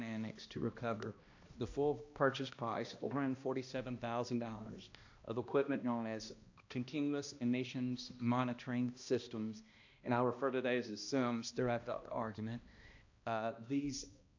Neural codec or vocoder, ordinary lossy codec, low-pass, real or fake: codec, 16 kHz, 1 kbps, X-Codec, HuBERT features, trained on balanced general audio; AAC, 48 kbps; 7.2 kHz; fake